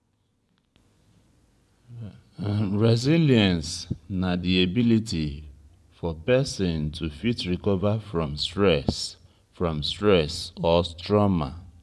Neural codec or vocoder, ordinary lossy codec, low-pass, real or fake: vocoder, 24 kHz, 100 mel bands, Vocos; none; none; fake